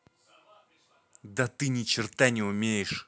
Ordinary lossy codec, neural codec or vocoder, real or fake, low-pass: none; none; real; none